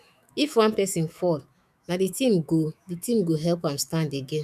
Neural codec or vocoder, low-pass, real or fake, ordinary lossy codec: autoencoder, 48 kHz, 128 numbers a frame, DAC-VAE, trained on Japanese speech; 14.4 kHz; fake; none